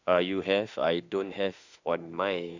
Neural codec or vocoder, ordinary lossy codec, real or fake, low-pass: autoencoder, 48 kHz, 32 numbers a frame, DAC-VAE, trained on Japanese speech; none; fake; 7.2 kHz